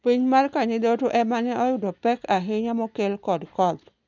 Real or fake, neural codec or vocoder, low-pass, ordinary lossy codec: real; none; 7.2 kHz; none